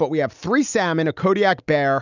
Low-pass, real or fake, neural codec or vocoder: 7.2 kHz; real; none